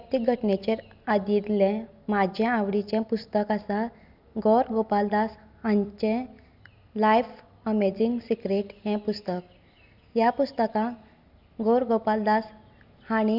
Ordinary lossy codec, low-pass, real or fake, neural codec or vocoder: none; 5.4 kHz; real; none